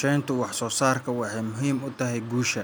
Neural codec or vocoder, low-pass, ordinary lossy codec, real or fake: none; none; none; real